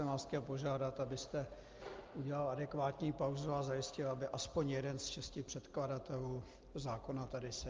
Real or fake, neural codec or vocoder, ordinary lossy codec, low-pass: real; none; Opus, 24 kbps; 7.2 kHz